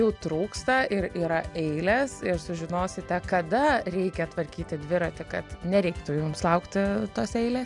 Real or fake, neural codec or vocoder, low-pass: real; none; 10.8 kHz